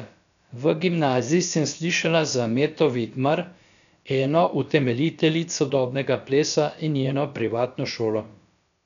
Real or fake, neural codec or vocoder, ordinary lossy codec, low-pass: fake; codec, 16 kHz, about 1 kbps, DyCAST, with the encoder's durations; none; 7.2 kHz